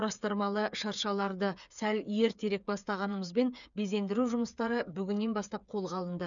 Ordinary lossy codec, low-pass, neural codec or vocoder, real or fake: none; 7.2 kHz; codec, 16 kHz, 8 kbps, FreqCodec, smaller model; fake